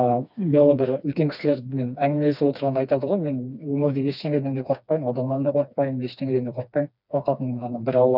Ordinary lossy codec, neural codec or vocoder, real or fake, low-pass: none; codec, 16 kHz, 2 kbps, FreqCodec, smaller model; fake; 5.4 kHz